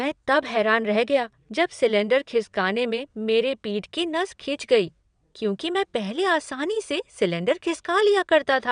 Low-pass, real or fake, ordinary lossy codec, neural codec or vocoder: 9.9 kHz; fake; none; vocoder, 22.05 kHz, 80 mel bands, WaveNeXt